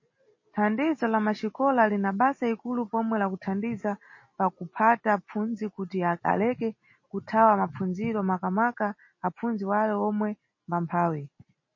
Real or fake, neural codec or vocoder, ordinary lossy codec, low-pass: real; none; MP3, 32 kbps; 7.2 kHz